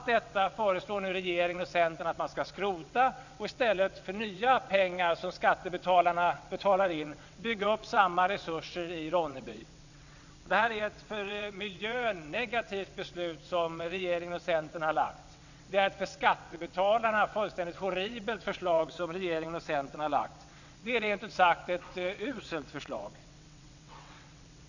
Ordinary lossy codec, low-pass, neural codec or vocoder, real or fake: none; 7.2 kHz; vocoder, 22.05 kHz, 80 mel bands, WaveNeXt; fake